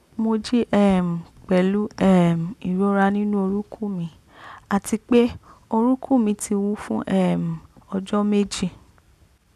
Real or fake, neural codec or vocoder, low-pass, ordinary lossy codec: real; none; 14.4 kHz; none